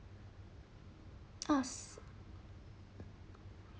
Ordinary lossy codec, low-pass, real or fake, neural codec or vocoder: none; none; real; none